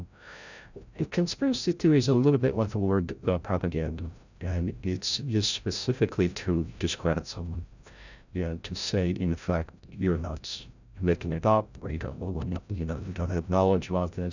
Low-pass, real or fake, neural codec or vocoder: 7.2 kHz; fake; codec, 16 kHz, 0.5 kbps, FreqCodec, larger model